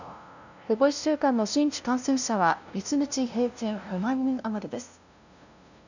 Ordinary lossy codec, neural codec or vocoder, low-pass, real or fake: none; codec, 16 kHz, 0.5 kbps, FunCodec, trained on LibriTTS, 25 frames a second; 7.2 kHz; fake